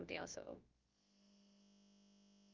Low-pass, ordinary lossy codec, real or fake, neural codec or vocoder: 7.2 kHz; Opus, 24 kbps; fake; codec, 16 kHz, about 1 kbps, DyCAST, with the encoder's durations